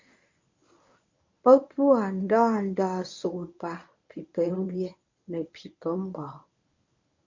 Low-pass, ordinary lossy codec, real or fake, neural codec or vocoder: 7.2 kHz; MP3, 64 kbps; fake; codec, 24 kHz, 0.9 kbps, WavTokenizer, medium speech release version 1